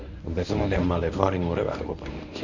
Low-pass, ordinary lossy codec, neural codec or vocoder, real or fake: 7.2 kHz; none; codec, 24 kHz, 0.9 kbps, WavTokenizer, medium speech release version 1; fake